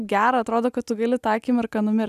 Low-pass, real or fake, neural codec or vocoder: 14.4 kHz; real; none